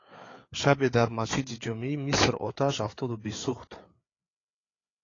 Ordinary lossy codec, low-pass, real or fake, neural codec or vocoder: AAC, 32 kbps; 7.2 kHz; fake; codec, 16 kHz, 4 kbps, FreqCodec, larger model